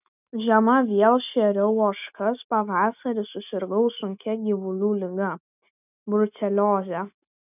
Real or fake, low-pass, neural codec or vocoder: real; 3.6 kHz; none